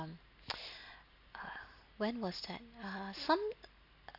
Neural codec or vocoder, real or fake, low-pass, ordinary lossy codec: none; real; 5.4 kHz; none